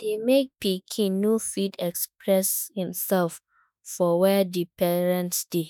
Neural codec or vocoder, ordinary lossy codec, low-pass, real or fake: autoencoder, 48 kHz, 32 numbers a frame, DAC-VAE, trained on Japanese speech; none; none; fake